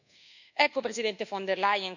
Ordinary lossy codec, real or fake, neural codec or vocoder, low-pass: none; fake; codec, 24 kHz, 1.2 kbps, DualCodec; 7.2 kHz